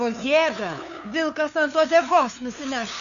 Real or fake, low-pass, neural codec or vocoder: fake; 7.2 kHz; codec, 16 kHz, 2 kbps, FunCodec, trained on LibriTTS, 25 frames a second